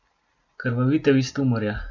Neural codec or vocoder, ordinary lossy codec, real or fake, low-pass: none; none; real; 7.2 kHz